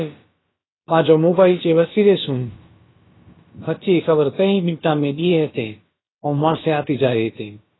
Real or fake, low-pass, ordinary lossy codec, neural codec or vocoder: fake; 7.2 kHz; AAC, 16 kbps; codec, 16 kHz, about 1 kbps, DyCAST, with the encoder's durations